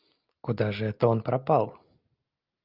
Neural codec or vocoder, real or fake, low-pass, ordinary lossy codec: none; real; 5.4 kHz; Opus, 24 kbps